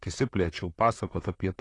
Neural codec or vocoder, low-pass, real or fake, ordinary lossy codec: vocoder, 44.1 kHz, 128 mel bands, Pupu-Vocoder; 10.8 kHz; fake; AAC, 32 kbps